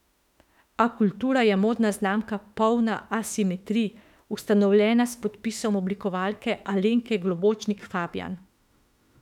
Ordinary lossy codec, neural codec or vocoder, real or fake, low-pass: none; autoencoder, 48 kHz, 32 numbers a frame, DAC-VAE, trained on Japanese speech; fake; 19.8 kHz